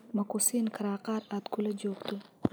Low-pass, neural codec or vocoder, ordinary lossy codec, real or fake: none; none; none; real